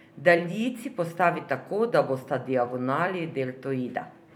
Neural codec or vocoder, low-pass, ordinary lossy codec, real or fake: vocoder, 44.1 kHz, 128 mel bands every 256 samples, BigVGAN v2; 19.8 kHz; none; fake